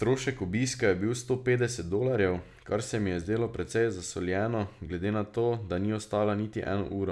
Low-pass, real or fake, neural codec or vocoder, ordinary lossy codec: none; real; none; none